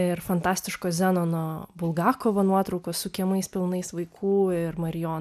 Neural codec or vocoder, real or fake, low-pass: none; real; 14.4 kHz